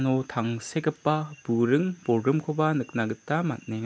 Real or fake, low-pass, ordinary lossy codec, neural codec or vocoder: real; none; none; none